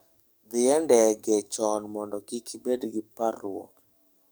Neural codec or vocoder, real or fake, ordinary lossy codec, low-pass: codec, 44.1 kHz, 7.8 kbps, DAC; fake; none; none